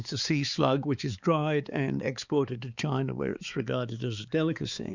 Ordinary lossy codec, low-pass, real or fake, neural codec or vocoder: Opus, 64 kbps; 7.2 kHz; fake; codec, 16 kHz, 4 kbps, X-Codec, HuBERT features, trained on balanced general audio